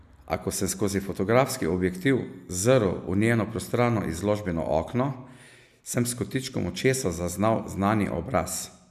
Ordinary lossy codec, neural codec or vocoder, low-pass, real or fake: none; none; 14.4 kHz; real